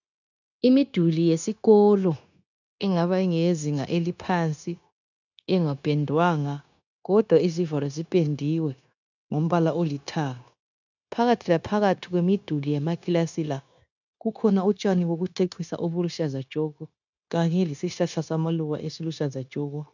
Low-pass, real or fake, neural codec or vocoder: 7.2 kHz; fake; codec, 16 kHz, 0.9 kbps, LongCat-Audio-Codec